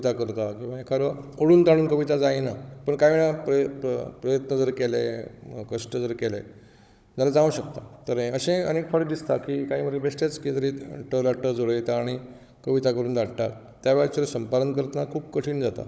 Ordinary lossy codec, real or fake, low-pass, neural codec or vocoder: none; fake; none; codec, 16 kHz, 16 kbps, FunCodec, trained on Chinese and English, 50 frames a second